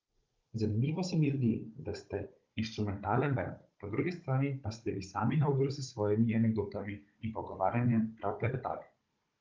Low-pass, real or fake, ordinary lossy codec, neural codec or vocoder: 7.2 kHz; fake; Opus, 24 kbps; codec, 16 kHz, 16 kbps, FunCodec, trained on Chinese and English, 50 frames a second